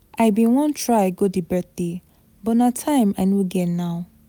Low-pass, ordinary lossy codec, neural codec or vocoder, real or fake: none; none; none; real